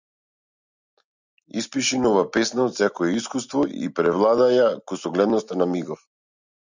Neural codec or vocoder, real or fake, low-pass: none; real; 7.2 kHz